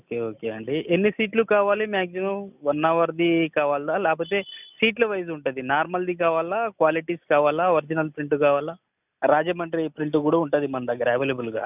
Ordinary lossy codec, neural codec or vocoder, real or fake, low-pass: none; none; real; 3.6 kHz